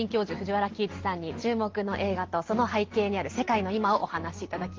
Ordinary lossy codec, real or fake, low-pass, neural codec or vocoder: Opus, 16 kbps; real; 7.2 kHz; none